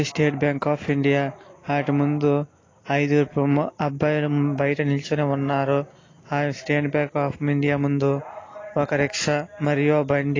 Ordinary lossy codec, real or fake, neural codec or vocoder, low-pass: AAC, 32 kbps; real; none; 7.2 kHz